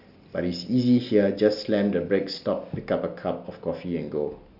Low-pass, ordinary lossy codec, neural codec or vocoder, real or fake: 5.4 kHz; none; none; real